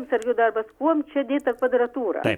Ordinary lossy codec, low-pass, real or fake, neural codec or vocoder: MP3, 96 kbps; 19.8 kHz; real; none